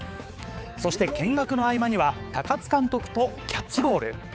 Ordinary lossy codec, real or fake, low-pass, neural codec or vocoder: none; fake; none; codec, 16 kHz, 4 kbps, X-Codec, HuBERT features, trained on balanced general audio